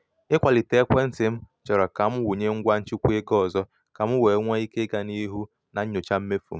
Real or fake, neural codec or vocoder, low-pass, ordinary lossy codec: real; none; none; none